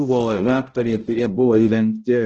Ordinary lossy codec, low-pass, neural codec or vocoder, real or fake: Opus, 16 kbps; 7.2 kHz; codec, 16 kHz, 0.5 kbps, X-Codec, HuBERT features, trained on balanced general audio; fake